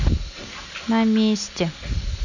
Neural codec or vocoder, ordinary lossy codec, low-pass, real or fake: none; none; 7.2 kHz; real